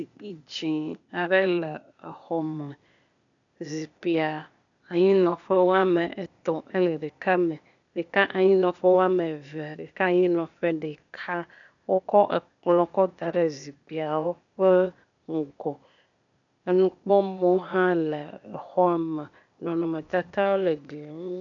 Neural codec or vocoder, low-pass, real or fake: codec, 16 kHz, 0.8 kbps, ZipCodec; 7.2 kHz; fake